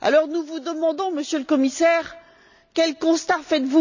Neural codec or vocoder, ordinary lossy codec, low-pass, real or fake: none; none; 7.2 kHz; real